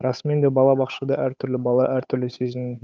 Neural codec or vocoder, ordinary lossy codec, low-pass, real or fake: codec, 16 kHz, 8 kbps, FunCodec, trained on Chinese and English, 25 frames a second; none; none; fake